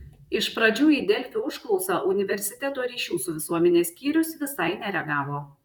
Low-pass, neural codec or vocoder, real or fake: 19.8 kHz; vocoder, 44.1 kHz, 128 mel bands, Pupu-Vocoder; fake